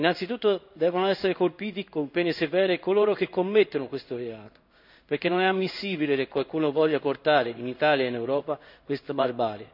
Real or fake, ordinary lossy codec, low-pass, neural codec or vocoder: fake; none; 5.4 kHz; codec, 16 kHz in and 24 kHz out, 1 kbps, XY-Tokenizer